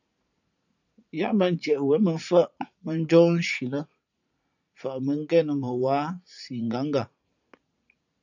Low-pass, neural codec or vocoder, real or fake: 7.2 kHz; vocoder, 44.1 kHz, 80 mel bands, Vocos; fake